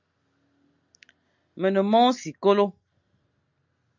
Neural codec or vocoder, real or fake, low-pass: none; real; 7.2 kHz